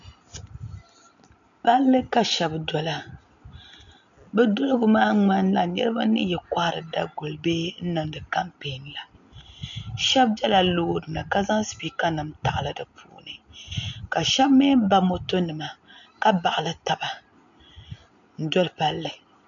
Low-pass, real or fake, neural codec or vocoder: 7.2 kHz; real; none